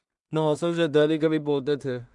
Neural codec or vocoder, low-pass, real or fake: codec, 16 kHz in and 24 kHz out, 0.4 kbps, LongCat-Audio-Codec, two codebook decoder; 10.8 kHz; fake